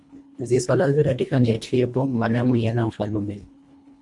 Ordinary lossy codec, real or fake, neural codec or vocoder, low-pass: MP3, 64 kbps; fake; codec, 24 kHz, 1.5 kbps, HILCodec; 10.8 kHz